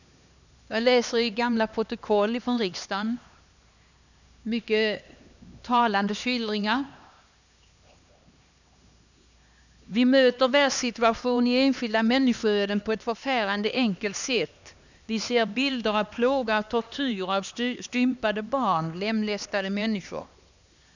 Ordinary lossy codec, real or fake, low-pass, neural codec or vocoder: none; fake; 7.2 kHz; codec, 16 kHz, 2 kbps, X-Codec, HuBERT features, trained on LibriSpeech